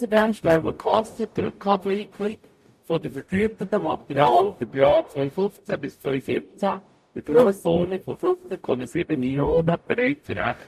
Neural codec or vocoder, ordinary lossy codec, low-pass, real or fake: codec, 44.1 kHz, 0.9 kbps, DAC; MP3, 64 kbps; 14.4 kHz; fake